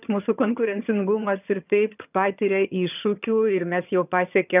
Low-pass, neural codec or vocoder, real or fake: 3.6 kHz; vocoder, 22.05 kHz, 80 mel bands, HiFi-GAN; fake